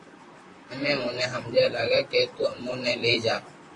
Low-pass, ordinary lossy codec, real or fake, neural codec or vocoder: 10.8 kHz; MP3, 48 kbps; fake; vocoder, 44.1 kHz, 128 mel bands, Pupu-Vocoder